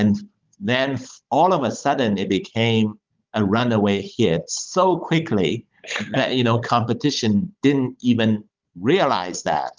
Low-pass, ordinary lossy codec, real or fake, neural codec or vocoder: 7.2 kHz; Opus, 24 kbps; fake; codec, 16 kHz, 16 kbps, FunCodec, trained on Chinese and English, 50 frames a second